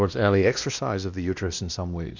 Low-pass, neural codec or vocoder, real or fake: 7.2 kHz; codec, 16 kHz, 1 kbps, X-Codec, WavLM features, trained on Multilingual LibriSpeech; fake